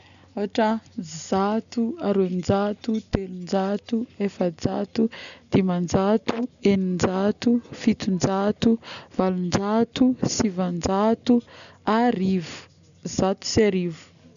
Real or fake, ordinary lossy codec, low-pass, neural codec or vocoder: real; none; 7.2 kHz; none